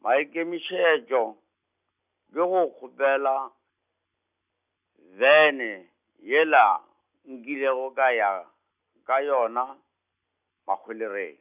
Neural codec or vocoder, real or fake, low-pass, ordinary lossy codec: none; real; 3.6 kHz; none